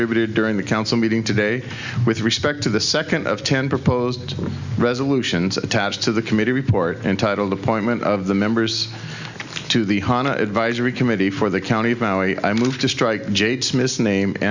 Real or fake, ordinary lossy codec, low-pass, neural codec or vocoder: real; Opus, 64 kbps; 7.2 kHz; none